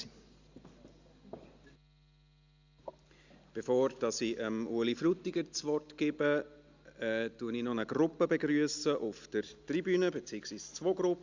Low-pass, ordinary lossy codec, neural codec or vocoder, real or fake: 7.2 kHz; Opus, 64 kbps; none; real